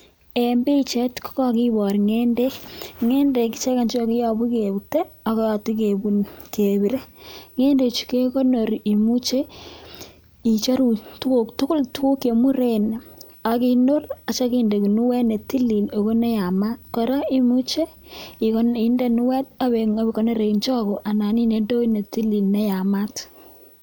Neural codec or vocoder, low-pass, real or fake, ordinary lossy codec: none; none; real; none